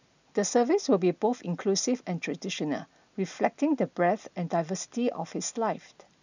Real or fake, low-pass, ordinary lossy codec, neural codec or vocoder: real; 7.2 kHz; none; none